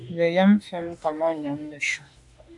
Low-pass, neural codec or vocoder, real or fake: 10.8 kHz; autoencoder, 48 kHz, 32 numbers a frame, DAC-VAE, trained on Japanese speech; fake